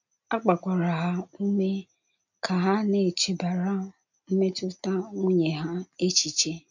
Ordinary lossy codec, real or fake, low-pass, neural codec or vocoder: none; real; 7.2 kHz; none